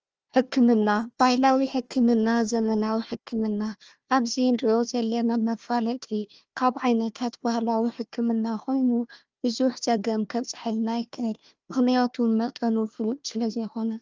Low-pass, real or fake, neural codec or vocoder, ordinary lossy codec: 7.2 kHz; fake; codec, 16 kHz, 1 kbps, FunCodec, trained on Chinese and English, 50 frames a second; Opus, 24 kbps